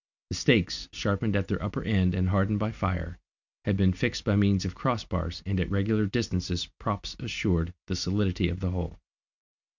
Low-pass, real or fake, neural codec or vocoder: 7.2 kHz; real; none